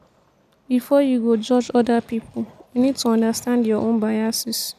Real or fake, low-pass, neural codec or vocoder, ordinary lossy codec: real; 14.4 kHz; none; none